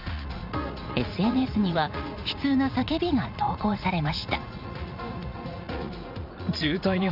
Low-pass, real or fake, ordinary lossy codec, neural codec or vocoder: 5.4 kHz; fake; none; vocoder, 44.1 kHz, 80 mel bands, Vocos